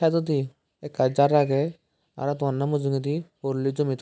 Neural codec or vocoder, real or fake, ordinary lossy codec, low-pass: none; real; none; none